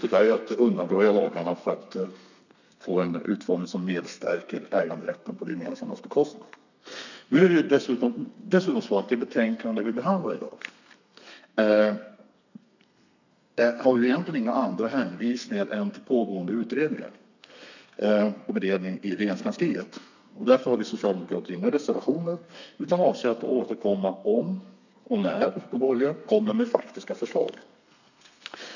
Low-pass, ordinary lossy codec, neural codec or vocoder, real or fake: 7.2 kHz; none; codec, 32 kHz, 1.9 kbps, SNAC; fake